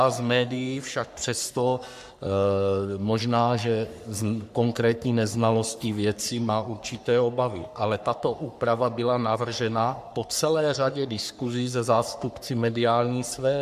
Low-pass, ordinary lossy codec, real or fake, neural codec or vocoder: 14.4 kHz; MP3, 96 kbps; fake; codec, 44.1 kHz, 3.4 kbps, Pupu-Codec